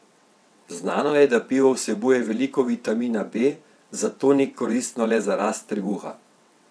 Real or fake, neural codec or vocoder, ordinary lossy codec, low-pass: fake; vocoder, 22.05 kHz, 80 mel bands, WaveNeXt; none; none